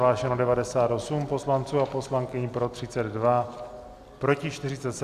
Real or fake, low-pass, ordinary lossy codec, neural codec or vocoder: real; 14.4 kHz; Opus, 64 kbps; none